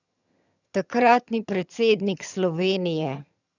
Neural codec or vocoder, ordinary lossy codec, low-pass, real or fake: vocoder, 22.05 kHz, 80 mel bands, HiFi-GAN; none; 7.2 kHz; fake